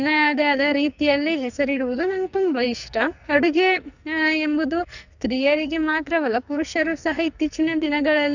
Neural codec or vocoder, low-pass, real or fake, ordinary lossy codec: codec, 44.1 kHz, 2.6 kbps, SNAC; 7.2 kHz; fake; none